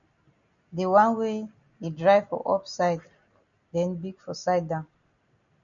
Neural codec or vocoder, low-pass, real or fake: none; 7.2 kHz; real